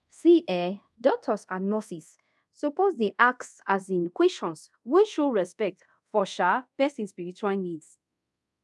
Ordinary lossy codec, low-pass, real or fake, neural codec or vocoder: none; none; fake; codec, 24 kHz, 0.5 kbps, DualCodec